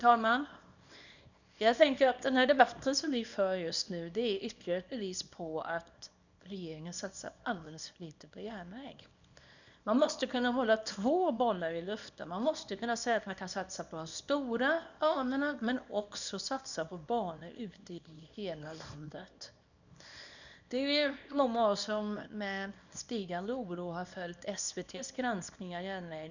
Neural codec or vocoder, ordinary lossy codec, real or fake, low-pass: codec, 24 kHz, 0.9 kbps, WavTokenizer, small release; none; fake; 7.2 kHz